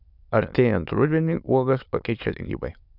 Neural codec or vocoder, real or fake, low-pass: autoencoder, 22.05 kHz, a latent of 192 numbers a frame, VITS, trained on many speakers; fake; 5.4 kHz